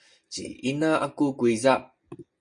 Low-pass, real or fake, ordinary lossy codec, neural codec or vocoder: 9.9 kHz; real; MP3, 48 kbps; none